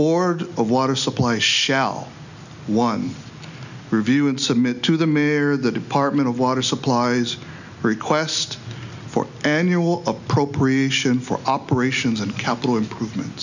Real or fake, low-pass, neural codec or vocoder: real; 7.2 kHz; none